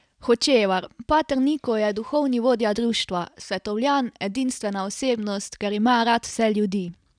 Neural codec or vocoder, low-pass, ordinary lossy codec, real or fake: none; 9.9 kHz; none; real